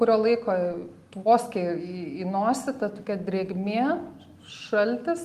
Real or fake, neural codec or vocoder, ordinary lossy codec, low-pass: real; none; Opus, 24 kbps; 14.4 kHz